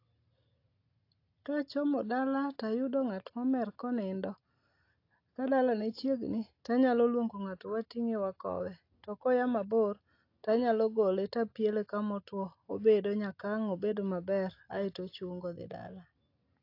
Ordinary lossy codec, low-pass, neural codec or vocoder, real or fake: AAC, 32 kbps; 5.4 kHz; none; real